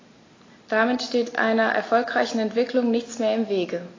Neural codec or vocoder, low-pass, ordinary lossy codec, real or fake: none; 7.2 kHz; AAC, 32 kbps; real